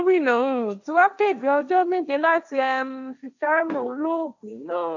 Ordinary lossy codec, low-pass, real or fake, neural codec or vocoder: none; 7.2 kHz; fake; codec, 16 kHz, 1.1 kbps, Voila-Tokenizer